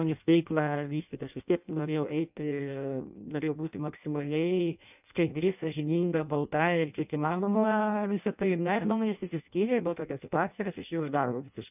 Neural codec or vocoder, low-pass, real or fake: codec, 16 kHz in and 24 kHz out, 0.6 kbps, FireRedTTS-2 codec; 3.6 kHz; fake